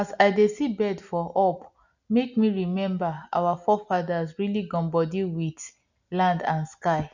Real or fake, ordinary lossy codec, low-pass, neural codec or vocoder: real; none; 7.2 kHz; none